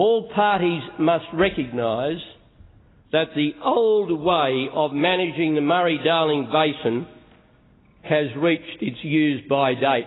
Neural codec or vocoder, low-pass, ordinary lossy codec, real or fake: none; 7.2 kHz; AAC, 16 kbps; real